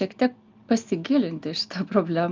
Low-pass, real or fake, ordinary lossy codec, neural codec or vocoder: 7.2 kHz; real; Opus, 24 kbps; none